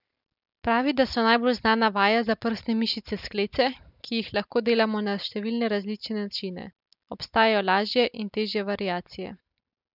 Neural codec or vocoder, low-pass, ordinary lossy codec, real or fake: none; 5.4 kHz; none; real